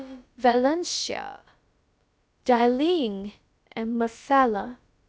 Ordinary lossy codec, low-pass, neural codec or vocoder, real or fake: none; none; codec, 16 kHz, about 1 kbps, DyCAST, with the encoder's durations; fake